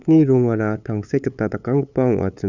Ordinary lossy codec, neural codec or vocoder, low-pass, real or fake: Opus, 64 kbps; codec, 16 kHz, 8 kbps, FunCodec, trained on LibriTTS, 25 frames a second; 7.2 kHz; fake